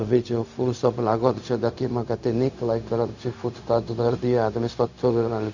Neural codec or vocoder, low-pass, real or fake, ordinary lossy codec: codec, 16 kHz, 0.4 kbps, LongCat-Audio-Codec; 7.2 kHz; fake; none